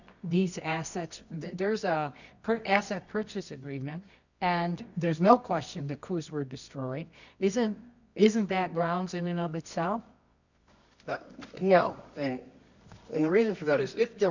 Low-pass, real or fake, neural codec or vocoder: 7.2 kHz; fake; codec, 24 kHz, 0.9 kbps, WavTokenizer, medium music audio release